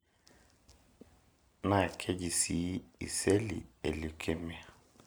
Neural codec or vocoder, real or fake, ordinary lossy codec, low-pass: none; real; none; none